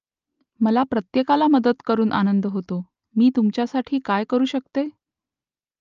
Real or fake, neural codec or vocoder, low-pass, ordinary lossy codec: real; none; 7.2 kHz; Opus, 24 kbps